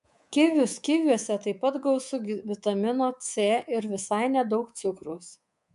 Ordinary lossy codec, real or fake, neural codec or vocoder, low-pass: MP3, 64 kbps; fake; codec, 24 kHz, 3.1 kbps, DualCodec; 10.8 kHz